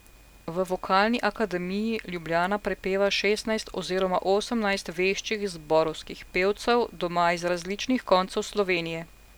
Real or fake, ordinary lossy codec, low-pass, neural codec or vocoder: real; none; none; none